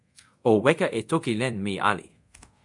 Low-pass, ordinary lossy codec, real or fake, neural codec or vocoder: 10.8 kHz; MP3, 64 kbps; fake; codec, 24 kHz, 0.5 kbps, DualCodec